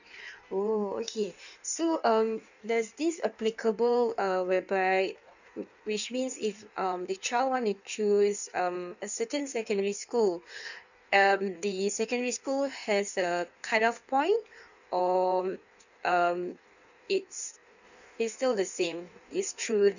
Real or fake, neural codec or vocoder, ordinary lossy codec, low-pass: fake; codec, 16 kHz in and 24 kHz out, 1.1 kbps, FireRedTTS-2 codec; none; 7.2 kHz